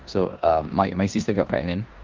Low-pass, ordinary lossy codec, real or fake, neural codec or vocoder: 7.2 kHz; Opus, 24 kbps; fake; codec, 16 kHz in and 24 kHz out, 0.9 kbps, LongCat-Audio-Codec, four codebook decoder